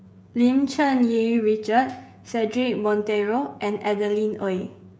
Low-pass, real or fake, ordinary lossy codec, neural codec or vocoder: none; fake; none; codec, 16 kHz, 16 kbps, FreqCodec, smaller model